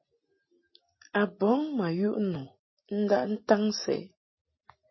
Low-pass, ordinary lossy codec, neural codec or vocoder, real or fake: 7.2 kHz; MP3, 24 kbps; none; real